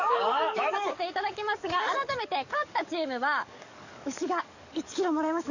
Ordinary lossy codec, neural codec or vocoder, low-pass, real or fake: none; codec, 44.1 kHz, 7.8 kbps, DAC; 7.2 kHz; fake